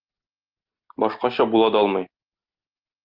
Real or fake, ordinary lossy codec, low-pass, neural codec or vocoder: real; Opus, 24 kbps; 5.4 kHz; none